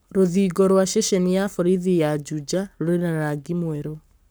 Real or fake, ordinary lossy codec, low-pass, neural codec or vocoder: fake; none; none; codec, 44.1 kHz, 7.8 kbps, Pupu-Codec